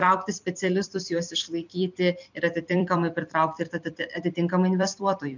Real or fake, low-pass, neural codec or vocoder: fake; 7.2 kHz; vocoder, 44.1 kHz, 128 mel bands every 256 samples, BigVGAN v2